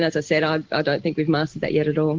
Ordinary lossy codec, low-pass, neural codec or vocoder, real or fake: Opus, 16 kbps; 7.2 kHz; none; real